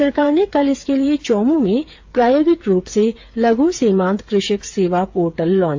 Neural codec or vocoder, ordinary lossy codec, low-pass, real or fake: codec, 16 kHz, 8 kbps, FreqCodec, smaller model; AAC, 48 kbps; 7.2 kHz; fake